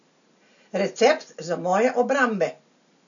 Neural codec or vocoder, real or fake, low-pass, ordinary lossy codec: none; real; 7.2 kHz; none